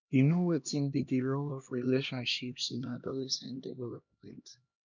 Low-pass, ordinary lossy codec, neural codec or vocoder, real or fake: 7.2 kHz; none; codec, 16 kHz, 1 kbps, X-Codec, HuBERT features, trained on LibriSpeech; fake